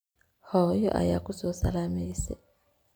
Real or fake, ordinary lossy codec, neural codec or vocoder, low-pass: real; none; none; none